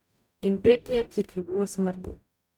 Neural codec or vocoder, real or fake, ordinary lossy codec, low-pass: codec, 44.1 kHz, 0.9 kbps, DAC; fake; none; 19.8 kHz